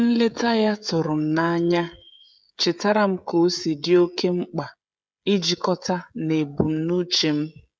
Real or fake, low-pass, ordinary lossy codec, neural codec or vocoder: fake; none; none; codec, 16 kHz, 16 kbps, FreqCodec, smaller model